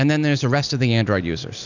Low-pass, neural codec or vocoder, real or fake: 7.2 kHz; none; real